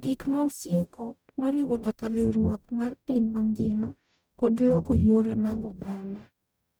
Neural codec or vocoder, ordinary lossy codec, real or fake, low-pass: codec, 44.1 kHz, 0.9 kbps, DAC; none; fake; none